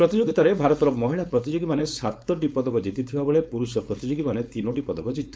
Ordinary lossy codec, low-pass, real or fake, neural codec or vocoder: none; none; fake; codec, 16 kHz, 4.8 kbps, FACodec